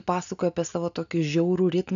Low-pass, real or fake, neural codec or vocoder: 7.2 kHz; real; none